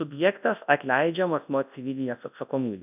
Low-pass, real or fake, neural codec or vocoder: 3.6 kHz; fake; codec, 24 kHz, 0.9 kbps, WavTokenizer, large speech release